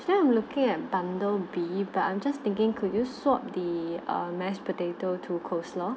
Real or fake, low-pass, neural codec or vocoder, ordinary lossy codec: real; none; none; none